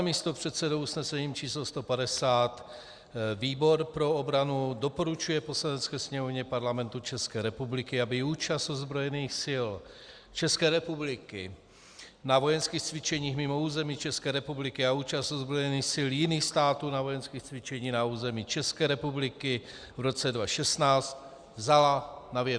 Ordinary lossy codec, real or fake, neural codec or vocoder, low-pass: Opus, 64 kbps; real; none; 9.9 kHz